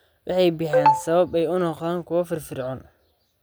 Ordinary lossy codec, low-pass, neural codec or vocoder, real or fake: none; none; none; real